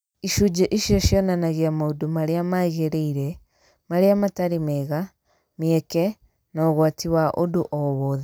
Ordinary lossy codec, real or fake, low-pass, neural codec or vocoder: none; real; none; none